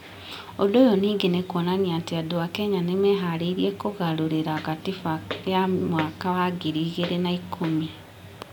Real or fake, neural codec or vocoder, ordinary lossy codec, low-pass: real; none; none; 19.8 kHz